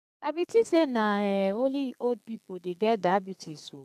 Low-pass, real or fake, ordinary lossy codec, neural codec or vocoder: 14.4 kHz; fake; none; codec, 32 kHz, 1.9 kbps, SNAC